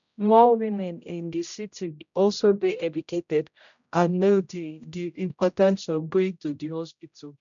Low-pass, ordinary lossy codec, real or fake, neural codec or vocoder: 7.2 kHz; none; fake; codec, 16 kHz, 0.5 kbps, X-Codec, HuBERT features, trained on general audio